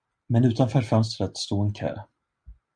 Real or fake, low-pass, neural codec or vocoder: real; 9.9 kHz; none